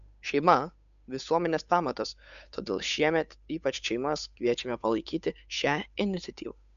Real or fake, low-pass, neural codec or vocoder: fake; 7.2 kHz; codec, 16 kHz, 8 kbps, FunCodec, trained on Chinese and English, 25 frames a second